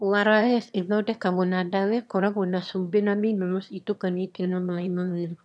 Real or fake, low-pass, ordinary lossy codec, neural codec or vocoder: fake; none; none; autoencoder, 22.05 kHz, a latent of 192 numbers a frame, VITS, trained on one speaker